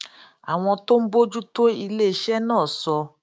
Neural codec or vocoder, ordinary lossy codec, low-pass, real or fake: codec, 16 kHz, 6 kbps, DAC; none; none; fake